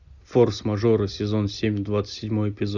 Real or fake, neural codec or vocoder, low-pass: real; none; 7.2 kHz